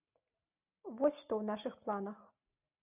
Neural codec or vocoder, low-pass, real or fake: none; 3.6 kHz; real